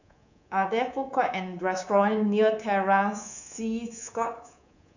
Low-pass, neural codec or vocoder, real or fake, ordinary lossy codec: 7.2 kHz; codec, 24 kHz, 3.1 kbps, DualCodec; fake; none